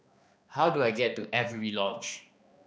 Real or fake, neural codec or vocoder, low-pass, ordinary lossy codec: fake; codec, 16 kHz, 2 kbps, X-Codec, HuBERT features, trained on balanced general audio; none; none